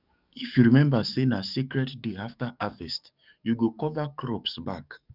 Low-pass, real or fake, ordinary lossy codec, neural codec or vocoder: 5.4 kHz; fake; none; codec, 44.1 kHz, 7.8 kbps, DAC